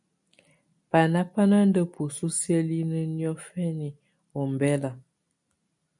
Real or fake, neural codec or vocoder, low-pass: real; none; 10.8 kHz